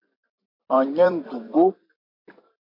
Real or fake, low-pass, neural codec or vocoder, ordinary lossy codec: fake; 5.4 kHz; vocoder, 44.1 kHz, 128 mel bands every 512 samples, BigVGAN v2; MP3, 32 kbps